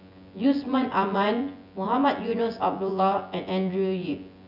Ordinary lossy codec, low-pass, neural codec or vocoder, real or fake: none; 5.4 kHz; vocoder, 24 kHz, 100 mel bands, Vocos; fake